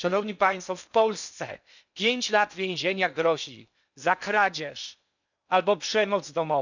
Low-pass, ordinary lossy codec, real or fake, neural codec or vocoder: 7.2 kHz; none; fake; codec, 16 kHz in and 24 kHz out, 0.8 kbps, FocalCodec, streaming, 65536 codes